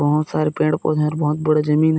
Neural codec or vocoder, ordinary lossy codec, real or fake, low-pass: none; none; real; none